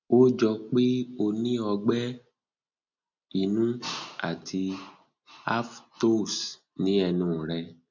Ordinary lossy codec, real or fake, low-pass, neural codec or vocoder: none; real; 7.2 kHz; none